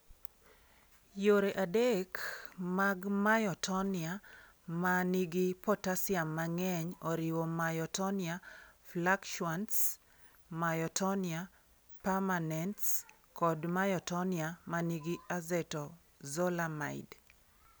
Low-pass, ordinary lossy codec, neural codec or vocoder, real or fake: none; none; none; real